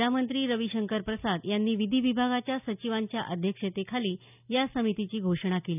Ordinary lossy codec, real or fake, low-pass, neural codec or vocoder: none; real; 3.6 kHz; none